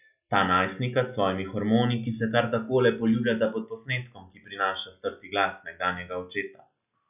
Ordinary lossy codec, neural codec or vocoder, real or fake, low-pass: none; none; real; 3.6 kHz